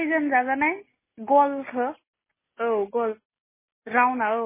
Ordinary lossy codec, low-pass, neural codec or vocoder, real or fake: MP3, 16 kbps; 3.6 kHz; none; real